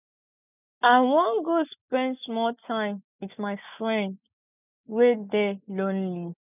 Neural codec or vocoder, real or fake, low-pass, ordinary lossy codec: none; real; 3.6 kHz; none